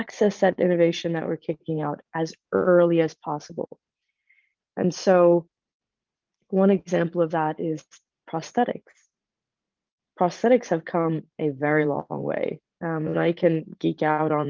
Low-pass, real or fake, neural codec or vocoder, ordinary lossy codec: 7.2 kHz; fake; vocoder, 44.1 kHz, 80 mel bands, Vocos; Opus, 24 kbps